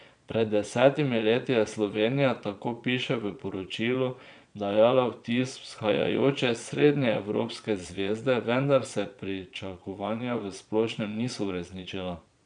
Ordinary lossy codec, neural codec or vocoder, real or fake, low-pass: none; vocoder, 22.05 kHz, 80 mel bands, Vocos; fake; 9.9 kHz